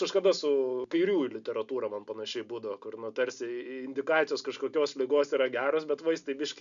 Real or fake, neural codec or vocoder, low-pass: real; none; 7.2 kHz